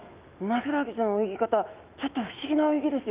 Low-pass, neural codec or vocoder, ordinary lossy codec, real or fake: 3.6 kHz; autoencoder, 48 kHz, 128 numbers a frame, DAC-VAE, trained on Japanese speech; Opus, 24 kbps; fake